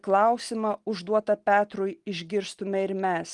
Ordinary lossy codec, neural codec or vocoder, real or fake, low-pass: Opus, 24 kbps; none; real; 10.8 kHz